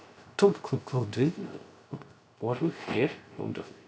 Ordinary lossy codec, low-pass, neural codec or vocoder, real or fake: none; none; codec, 16 kHz, 0.3 kbps, FocalCodec; fake